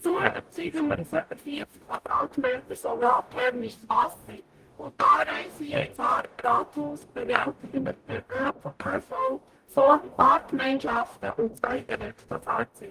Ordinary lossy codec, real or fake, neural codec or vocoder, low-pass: Opus, 24 kbps; fake; codec, 44.1 kHz, 0.9 kbps, DAC; 14.4 kHz